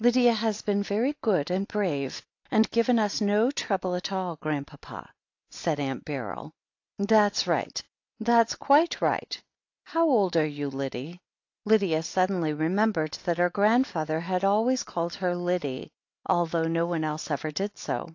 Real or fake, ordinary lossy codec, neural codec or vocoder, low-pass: real; AAC, 48 kbps; none; 7.2 kHz